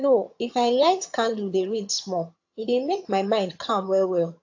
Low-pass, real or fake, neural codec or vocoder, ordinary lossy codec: 7.2 kHz; fake; vocoder, 22.05 kHz, 80 mel bands, HiFi-GAN; AAC, 48 kbps